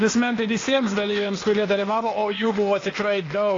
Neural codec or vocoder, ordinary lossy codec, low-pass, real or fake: codec, 16 kHz, 0.8 kbps, ZipCodec; AAC, 32 kbps; 7.2 kHz; fake